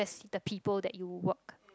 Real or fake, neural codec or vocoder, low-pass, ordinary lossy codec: real; none; none; none